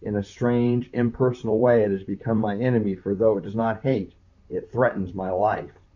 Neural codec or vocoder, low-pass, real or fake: vocoder, 44.1 kHz, 128 mel bands, Pupu-Vocoder; 7.2 kHz; fake